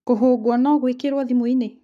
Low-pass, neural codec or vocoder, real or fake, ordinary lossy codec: 14.4 kHz; codec, 44.1 kHz, 7.8 kbps, Pupu-Codec; fake; none